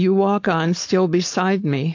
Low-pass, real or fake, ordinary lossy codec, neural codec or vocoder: 7.2 kHz; fake; AAC, 48 kbps; codec, 16 kHz, 4.8 kbps, FACodec